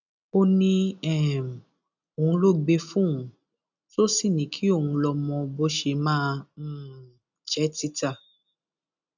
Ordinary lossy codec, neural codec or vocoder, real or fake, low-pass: none; none; real; 7.2 kHz